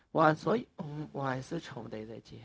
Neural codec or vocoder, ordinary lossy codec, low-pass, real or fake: codec, 16 kHz, 0.4 kbps, LongCat-Audio-Codec; none; none; fake